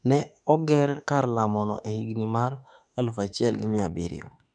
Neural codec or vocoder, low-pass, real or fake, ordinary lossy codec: autoencoder, 48 kHz, 32 numbers a frame, DAC-VAE, trained on Japanese speech; 9.9 kHz; fake; none